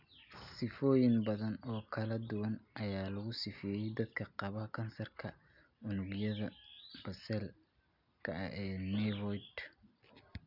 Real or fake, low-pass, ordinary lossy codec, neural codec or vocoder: real; 5.4 kHz; none; none